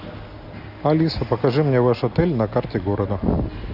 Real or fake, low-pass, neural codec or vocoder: real; 5.4 kHz; none